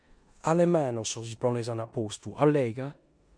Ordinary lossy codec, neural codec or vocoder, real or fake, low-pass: MP3, 64 kbps; codec, 16 kHz in and 24 kHz out, 0.9 kbps, LongCat-Audio-Codec, four codebook decoder; fake; 9.9 kHz